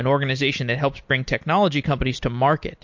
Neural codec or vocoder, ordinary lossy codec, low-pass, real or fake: none; MP3, 48 kbps; 7.2 kHz; real